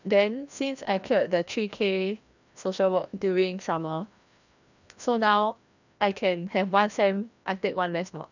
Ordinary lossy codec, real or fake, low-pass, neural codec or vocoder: none; fake; 7.2 kHz; codec, 16 kHz, 1 kbps, FreqCodec, larger model